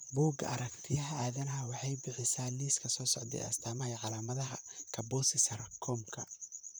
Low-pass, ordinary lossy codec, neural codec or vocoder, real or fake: none; none; vocoder, 44.1 kHz, 128 mel bands, Pupu-Vocoder; fake